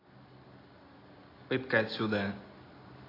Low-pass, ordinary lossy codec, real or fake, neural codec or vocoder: 5.4 kHz; AAC, 24 kbps; real; none